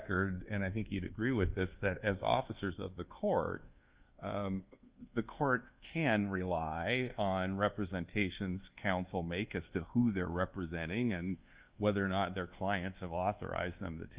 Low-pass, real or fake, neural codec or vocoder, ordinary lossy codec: 3.6 kHz; fake; codec, 24 kHz, 1.2 kbps, DualCodec; Opus, 32 kbps